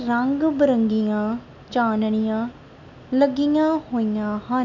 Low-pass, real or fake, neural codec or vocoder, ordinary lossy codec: 7.2 kHz; real; none; MP3, 64 kbps